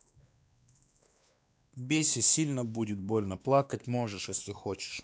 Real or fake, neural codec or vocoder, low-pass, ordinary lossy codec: fake; codec, 16 kHz, 2 kbps, X-Codec, WavLM features, trained on Multilingual LibriSpeech; none; none